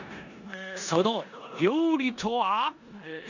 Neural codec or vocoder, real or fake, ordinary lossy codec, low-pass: codec, 16 kHz in and 24 kHz out, 0.9 kbps, LongCat-Audio-Codec, four codebook decoder; fake; none; 7.2 kHz